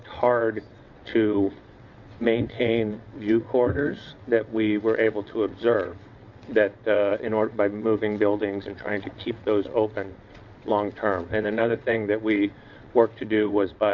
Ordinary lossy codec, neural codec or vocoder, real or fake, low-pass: MP3, 48 kbps; vocoder, 22.05 kHz, 80 mel bands, WaveNeXt; fake; 7.2 kHz